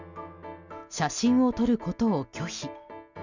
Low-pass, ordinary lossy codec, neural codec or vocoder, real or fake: 7.2 kHz; Opus, 64 kbps; none; real